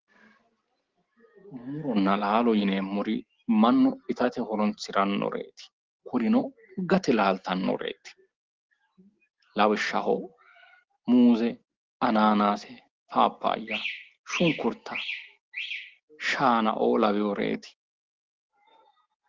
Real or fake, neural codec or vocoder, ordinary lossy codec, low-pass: real; none; Opus, 16 kbps; 7.2 kHz